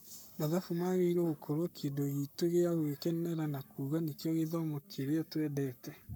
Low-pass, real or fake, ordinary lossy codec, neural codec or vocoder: none; fake; none; codec, 44.1 kHz, 7.8 kbps, Pupu-Codec